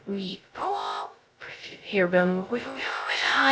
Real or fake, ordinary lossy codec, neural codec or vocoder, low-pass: fake; none; codec, 16 kHz, 0.2 kbps, FocalCodec; none